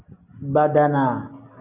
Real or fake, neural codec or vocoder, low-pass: real; none; 3.6 kHz